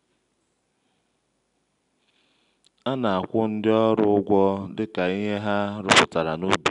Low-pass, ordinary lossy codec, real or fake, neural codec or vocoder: 10.8 kHz; none; real; none